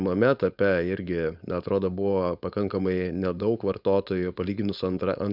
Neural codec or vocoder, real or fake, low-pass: codec, 16 kHz, 4.8 kbps, FACodec; fake; 5.4 kHz